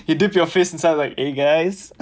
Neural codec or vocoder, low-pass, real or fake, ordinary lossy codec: none; none; real; none